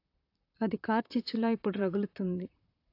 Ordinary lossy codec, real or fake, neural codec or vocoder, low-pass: AAC, 32 kbps; fake; vocoder, 44.1 kHz, 128 mel bands, Pupu-Vocoder; 5.4 kHz